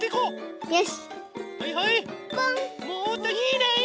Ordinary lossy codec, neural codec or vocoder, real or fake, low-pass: none; none; real; none